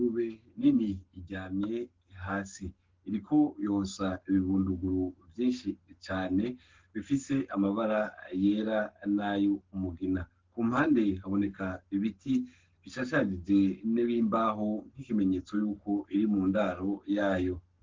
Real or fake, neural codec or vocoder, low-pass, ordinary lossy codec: fake; codec, 44.1 kHz, 7.8 kbps, Pupu-Codec; 7.2 kHz; Opus, 16 kbps